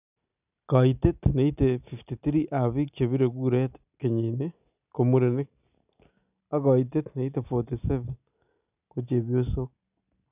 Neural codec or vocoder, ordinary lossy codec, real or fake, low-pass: none; none; real; 3.6 kHz